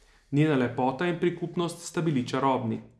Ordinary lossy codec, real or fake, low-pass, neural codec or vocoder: none; real; none; none